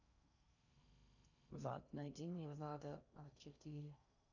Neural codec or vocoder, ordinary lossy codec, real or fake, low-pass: codec, 16 kHz in and 24 kHz out, 0.6 kbps, FocalCodec, streaming, 4096 codes; none; fake; 7.2 kHz